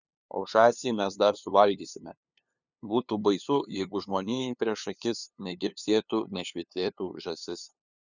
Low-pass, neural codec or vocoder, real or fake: 7.2 kHz; codec, 16 kHz, 2 kbps, FunCodec, trained on LibriTTS, 25 frames a second; fake